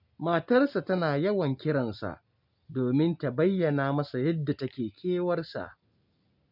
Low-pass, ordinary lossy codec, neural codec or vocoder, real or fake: 5.4 kHz; none; none; real